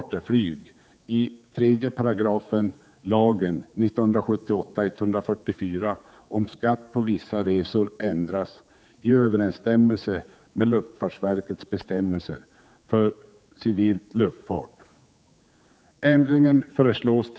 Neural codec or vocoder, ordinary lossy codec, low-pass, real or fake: codec, 16 kHz, 4 kbps, X-Codec, HuBERT features, trained on general audio; none; none; fake